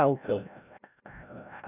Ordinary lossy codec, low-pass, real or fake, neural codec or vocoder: none; 3.6 kHz; fake; codec, 16 kHz, 0.5 kbps, FreqCodec, larger model